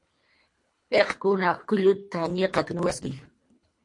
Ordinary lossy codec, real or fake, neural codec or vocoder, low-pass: MP3, 48 kbps; fake; codec, 24 kHz, 3 kbps, HILCodec; 10.8 kHz